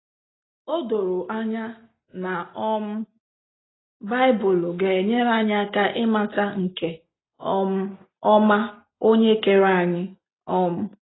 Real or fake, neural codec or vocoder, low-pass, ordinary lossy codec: real; none; 7.2 kHz; AAC, 16 kbps